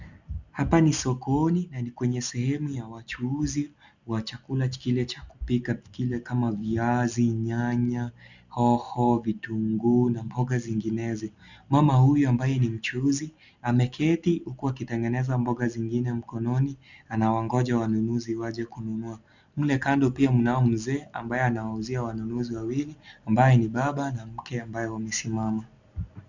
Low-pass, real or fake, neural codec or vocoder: 7.2 kHz; real; none